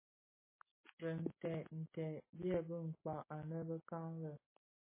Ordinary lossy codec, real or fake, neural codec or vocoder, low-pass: MP3, 16 kbps; real; none; 3.6 kHz